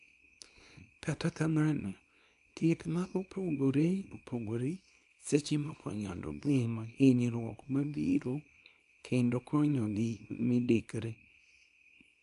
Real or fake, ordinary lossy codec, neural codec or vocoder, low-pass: fake; none; codec, 24 kHz, 0.9 kbps, WavTokenizer, small release; 10.8 kHz